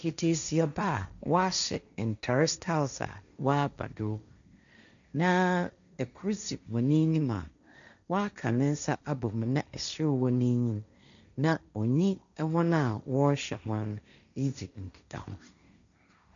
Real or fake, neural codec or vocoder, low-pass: fake; codec, 16 kHz, 1.1 kbps, Voila-Tokenizer; 7.2 kHz